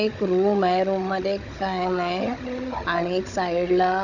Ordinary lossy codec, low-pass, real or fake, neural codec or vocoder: none; 7.2 kHz; fake; codec, 16 kHz, 16 kbps, FunCodec, trained on Chinese and English, 50 frames a second